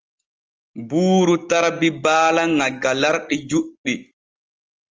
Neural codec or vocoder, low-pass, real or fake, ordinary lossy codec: none; 7.2 kHz; real; Opus, 24 kbps